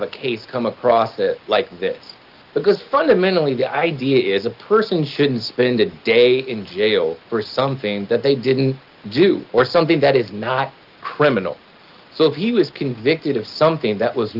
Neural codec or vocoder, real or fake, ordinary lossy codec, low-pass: none; real; Opus, 32 kbps; 5.4 kHz